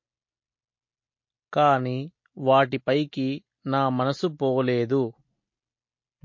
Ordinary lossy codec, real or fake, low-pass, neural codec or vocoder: MP3, 32 kbps; real; 7.2 kHz; none